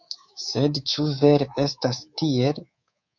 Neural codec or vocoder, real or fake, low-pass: codec, 24 kHz, 3.1 kbps, DualCodec; fake; 7.2 kHz